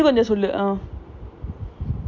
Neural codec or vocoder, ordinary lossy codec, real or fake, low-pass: none; none; real; 7.2 kHz